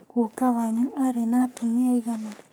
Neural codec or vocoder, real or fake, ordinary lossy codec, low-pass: codec, 44.1 kHz, 3.4 kbps, Pupu-Codec; fake; none; none